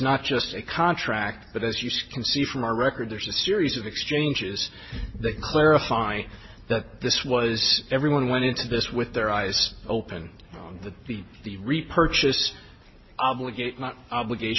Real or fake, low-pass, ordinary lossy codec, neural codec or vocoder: real; 7.2 kHz; MP3, 24 kbps; none